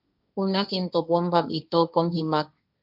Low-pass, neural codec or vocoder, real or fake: 5.4 kHz; codec, 16 kHz, 1.1 kbps, Voila-Tokenizer; fake